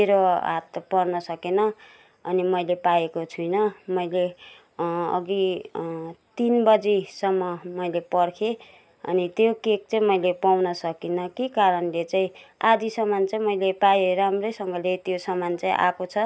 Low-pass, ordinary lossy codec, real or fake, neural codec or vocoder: none; none; real; none